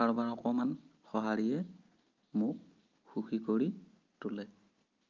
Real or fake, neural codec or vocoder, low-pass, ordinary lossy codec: real; none; 7.2 kHz; Opus, 24 kbps